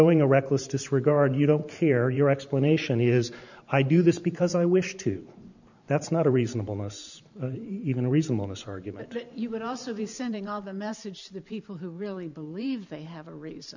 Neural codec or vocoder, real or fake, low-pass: vocoder, 44.1 kHz, 128 mel bands every 512 samples, BigVGAN v2; fake; 7.2 kHz